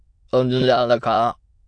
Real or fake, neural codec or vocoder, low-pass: fake; autoencoder, 22.05 kHz, a latent of 192 numbers a frame, VITS, trained on many speakers; 9.9 kHz